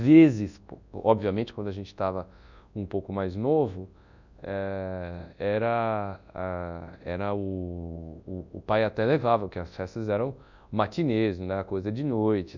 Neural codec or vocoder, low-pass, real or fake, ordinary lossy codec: codec, 24 kHz, 0.9 kbps, WavTokenizer, large speech release; 7.2 kHz; fake; none